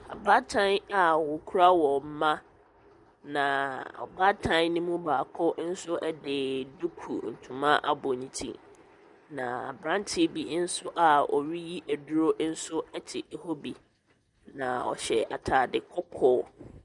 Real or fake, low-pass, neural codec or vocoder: real; 10.8 kHz; none